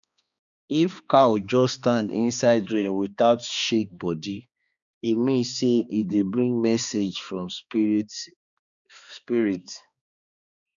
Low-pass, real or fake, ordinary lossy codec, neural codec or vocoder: 7.2 kHz; fake; none; codec, 16 kHz, 2 kbps, X-Codec, HuBERT features, trained on balanced general audio